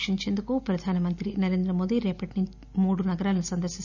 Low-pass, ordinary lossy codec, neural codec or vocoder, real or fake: 7.2 kHz; none; none; real